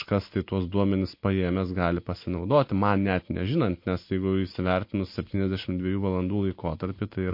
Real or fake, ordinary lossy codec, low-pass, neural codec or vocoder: real; MP3, 32 kbps; 5.4 kHz; none